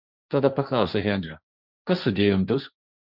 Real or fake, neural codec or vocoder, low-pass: fake; codec, 16 kHz, 1.1 kbps, Voila-Tokenizer; 5.4 kHz